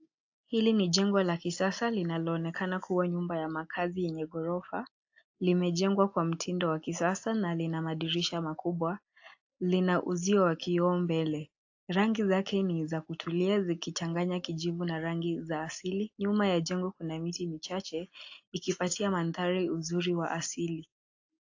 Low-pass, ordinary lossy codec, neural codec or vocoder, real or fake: 7.2 kHz; AAC, 48 kbps; none; real